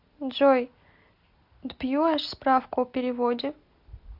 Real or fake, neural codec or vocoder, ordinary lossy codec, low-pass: real; none; MP3, 48 kbps; 5.4 kHz